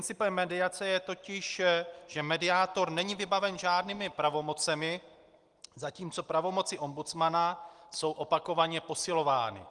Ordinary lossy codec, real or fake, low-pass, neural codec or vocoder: Opus, 24 kbps; real; 10.8 kHz; none